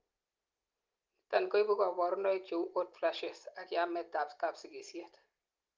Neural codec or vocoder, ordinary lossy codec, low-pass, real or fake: none; Opus, 32 kbps; 7.2 kHz; real